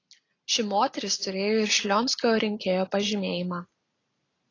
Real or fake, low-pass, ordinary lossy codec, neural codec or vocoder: real; 7.2 kHz; AAC, 32 kbps; none